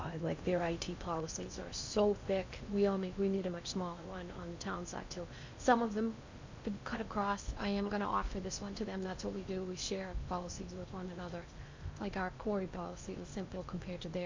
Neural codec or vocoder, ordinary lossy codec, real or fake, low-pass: codec, 16 kHz in and 24 kHz out, 0.6 kbps, FocalCodec, streaming, 4096 codes; MP3, 64 kbps; fake; 7.2 kHz